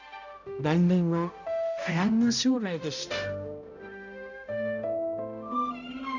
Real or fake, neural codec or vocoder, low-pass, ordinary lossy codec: fake; codec, 16 kHz, 0.5 kbps, X-Codec, HuBERT features, trained on balanced general audio; 7.2 kHz; none